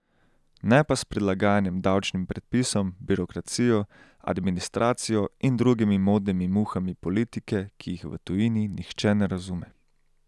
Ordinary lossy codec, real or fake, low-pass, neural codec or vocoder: none; real; none; none